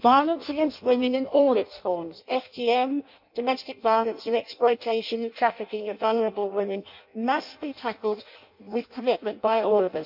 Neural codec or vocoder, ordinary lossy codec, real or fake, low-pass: codec, 16 kHz in and 24 kHz out, 0.6 kbps, FireRedTTS-2 codec; MP3, 48 kbps; fake; 5.4 kHz